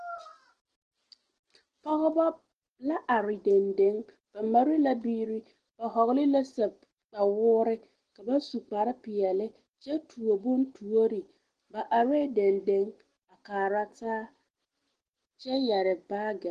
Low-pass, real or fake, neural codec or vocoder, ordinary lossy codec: 9.9 kHz; real; none; Opus, 16 kbps